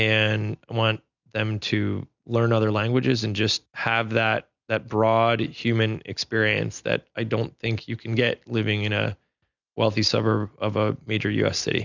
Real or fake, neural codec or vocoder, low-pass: real; none; 7.2 kHz